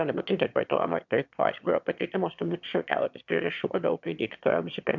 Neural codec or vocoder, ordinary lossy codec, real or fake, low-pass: autoencoder, 22.05 kHz, a latent of 192 numbers a frame, VITS, trained on one speaker; MP3, 64 kbps; fake; 7.2 kHz